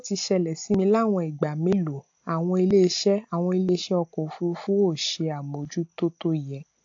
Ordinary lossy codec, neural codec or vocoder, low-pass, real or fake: AAC, 48 kbps; none; 7.2 kHz; real